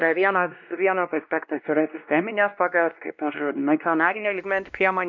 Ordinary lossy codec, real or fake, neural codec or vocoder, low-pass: MP3, 64 kbps; fake; codec, 16 kHz, 1 kbps, X-Codec, WavLM features, trained on Multilingual LibriSpeech; 7.2 kHz